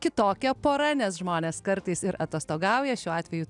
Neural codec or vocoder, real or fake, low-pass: none; real; 10.8 kHz